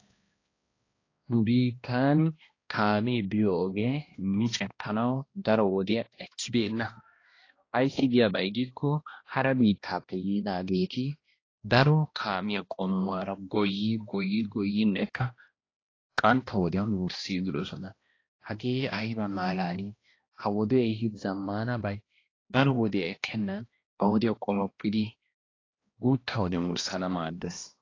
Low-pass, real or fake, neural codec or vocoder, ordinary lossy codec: 7.2 kHz; fake; codec, 16 kHz, 1 kbps, X-Codec, HuBERT features, trained on balanced general audio; AAC, 32 kbps